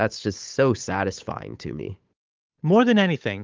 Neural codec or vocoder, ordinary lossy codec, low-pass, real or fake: codec, 16 kHz, 8 kbps, FunCodec, trained on Chinese and English, 25 frames a second; Opus, 24 kbps; 7.2 kHz; fake